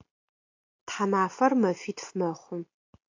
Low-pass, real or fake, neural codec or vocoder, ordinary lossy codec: 7.2 kHz; real; none; AAC, 48 kbps